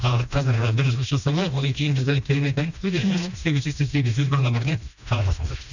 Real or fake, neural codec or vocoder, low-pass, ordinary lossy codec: fake; codec, 16 kHz, 1 kbps, FreqCodec, smaller model; 7.2 kHz; none